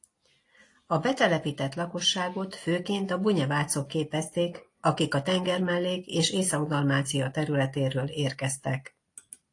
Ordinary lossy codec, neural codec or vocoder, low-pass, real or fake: AAC, 48 kbps; none; 10.8 kHz; real